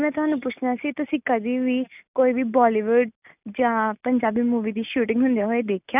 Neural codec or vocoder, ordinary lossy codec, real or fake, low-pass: none; none; real; 3.6 kHz